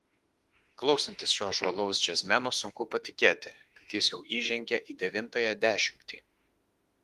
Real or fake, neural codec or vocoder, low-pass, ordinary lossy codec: fake; autoencoder, 48 kHz, 32 numbers a frame, DAC-VAE, trained on Japanese speech; 14.4 kHz; Opus, 24 kbps